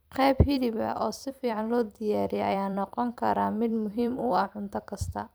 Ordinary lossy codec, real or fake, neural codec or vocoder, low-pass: none; fake; vocoder, 44.1 kHz, 128 mel bands every 256 samples, BigVGAN v2; none